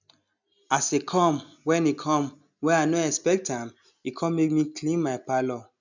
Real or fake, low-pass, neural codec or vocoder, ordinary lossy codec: real; 7.2 kHz; none; none